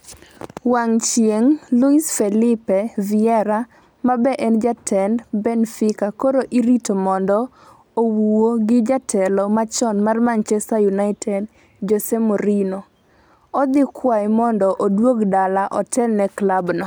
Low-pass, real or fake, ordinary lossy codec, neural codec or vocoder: none; real; none; none